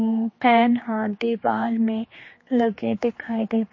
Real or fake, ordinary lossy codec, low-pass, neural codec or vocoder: fake; MP3, 32 kbps; 7.2 kHz; codec, 16 kHz, 2 kbps, X-Codec, HuBERT features, trained on general audio